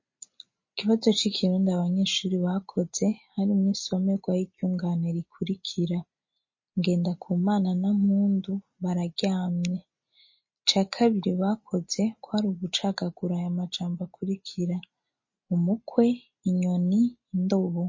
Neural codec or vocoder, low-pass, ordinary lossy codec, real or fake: none; 7.2 kHz; MP3, 32 kbps; real